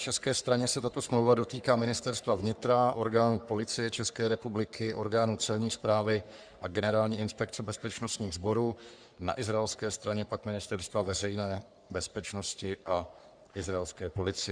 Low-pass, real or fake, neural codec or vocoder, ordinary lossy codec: 9.9 kHz; fake; codec, 44.1 kHz, 3.4 kbps, Pupu-Codec; Opus, 64 kbps